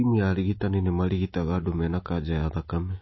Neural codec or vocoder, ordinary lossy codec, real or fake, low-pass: none; MP3, 24 kbps; real; 7.2 kHz